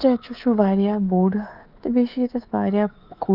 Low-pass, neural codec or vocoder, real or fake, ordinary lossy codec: 5.4 kHz; codec, 24 kHz, 3.1 kbps, DualCodec; fake; Opus, 24 kbps